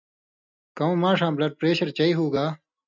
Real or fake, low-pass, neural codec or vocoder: real; 7.2 kHz; none